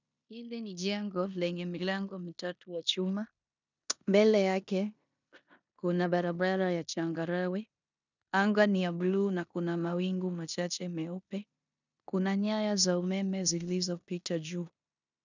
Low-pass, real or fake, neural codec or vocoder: 7.2 kHz; fake; codec, 16 kHz in and 24 kHz out, 0.9 kbps, LongCat-Audio-Codec, four codebook decoder